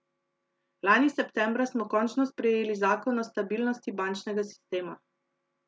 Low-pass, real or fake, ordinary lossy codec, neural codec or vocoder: none; real; none; none